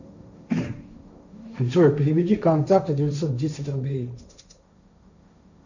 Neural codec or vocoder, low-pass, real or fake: codec, 16 kHz, 1.1 kbps, Voila-Tokenizer; 7.2 kHz; fake